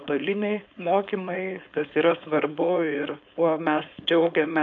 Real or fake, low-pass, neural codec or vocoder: fake; 7.2 kHz; codec, 16 kHz, 4.8 kbps, FACodec